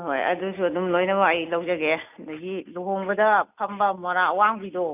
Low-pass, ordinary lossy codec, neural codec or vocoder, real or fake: 3.6 kHz; none; none; real